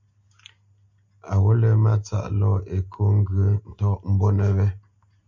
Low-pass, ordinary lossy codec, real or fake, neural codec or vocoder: 7.2 kHz; MP3, 64 kbps; real; none